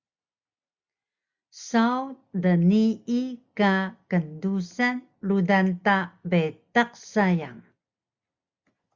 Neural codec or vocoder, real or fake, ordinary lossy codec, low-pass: none; real; Opus, 64 kbps; 7.2 kHz